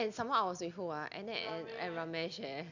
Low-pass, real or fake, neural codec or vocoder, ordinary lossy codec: 7.2 kHz; real; none; none